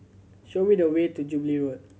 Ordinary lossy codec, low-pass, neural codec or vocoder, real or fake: none; none; none; real